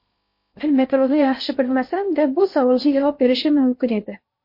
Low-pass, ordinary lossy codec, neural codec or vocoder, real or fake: 5.4 kHz; MP3, 32 kbps; codec, 16 kHz in and 24 kHz out, 0.6 kbps, FocalCodec, streaming, 2048 codes; fake